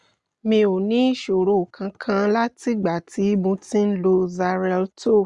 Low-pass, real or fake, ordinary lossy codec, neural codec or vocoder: 10.8 kHz; real; Opus, 64 kbps; none